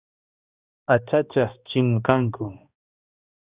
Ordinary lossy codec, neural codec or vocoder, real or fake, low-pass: Opus, 64 kbps; codec, 16 kHz, 1 kbps, X-Codec, HuBERT features, trained on balanced general audio; fake; 3.6 kHz